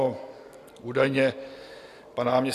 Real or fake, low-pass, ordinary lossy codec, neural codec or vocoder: real; 14.4 kHz; AAC, 64 kbps; none